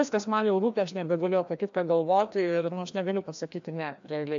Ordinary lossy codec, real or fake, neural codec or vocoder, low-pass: AAC, 64 kbps; fake; codec, 16 kHz, 1 kbps, FreqCodec, larger model; 7.2 kHz